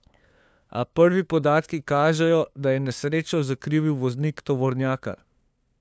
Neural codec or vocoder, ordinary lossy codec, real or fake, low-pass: codec, 16 kHz, 2 kbps, FunCodec, trained on LibriTTS, 25 frames a second; none; fake; none